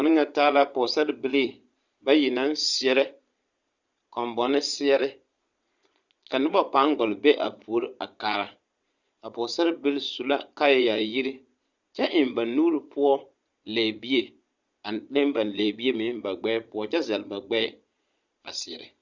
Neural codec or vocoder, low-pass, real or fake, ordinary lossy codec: vocoder, 22.05 kHz, 80 mel bands, Vocos; 7.2 kHz; fake; Opus, 64 kbps